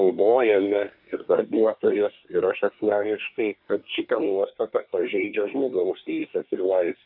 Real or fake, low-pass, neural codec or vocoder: fake; 5.4 kHz; codec, 24 kHz, 1 kbps, SNAC